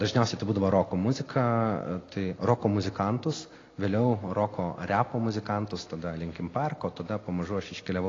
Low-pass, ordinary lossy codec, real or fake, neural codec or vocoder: 7.2 kHz; AAC, 32 kbps; real; none